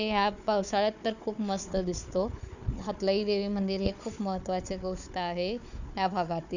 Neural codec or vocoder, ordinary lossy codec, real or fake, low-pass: codec, 16 kHz, 4 kbps, FunCodec, trained on Chinese and English, 50 frames a second; none; fake; 7.2 kHz